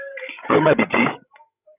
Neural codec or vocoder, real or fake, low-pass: codec, 16 kHz, 16 kbps, FreqCodec, larger model; fake; 3.6 kHz